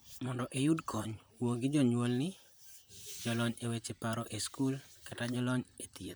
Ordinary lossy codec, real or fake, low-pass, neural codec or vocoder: none; fake; none; vocoder, 44.1 kHz, 128 mel bands, Pupu-Vocoder